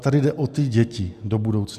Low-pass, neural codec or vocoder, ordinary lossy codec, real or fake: 14.4 kHz; none; AAC, 96 kbps; real